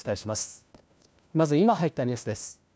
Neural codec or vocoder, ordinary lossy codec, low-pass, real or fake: codec, 16 kHz, 1 kbps, FunCodec, trained on LibriTTS, 50 frames a second; none; none; fake